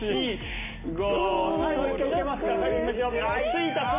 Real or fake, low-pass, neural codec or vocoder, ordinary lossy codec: real; 3.6 kHz; none; MP3, 32 kbps